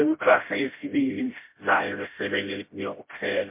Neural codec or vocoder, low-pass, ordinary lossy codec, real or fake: codec, 16 kHz, 0.5 kbps, FreqCodec, smaller model; 3.6 kHz; MP3, 24 kbps; fake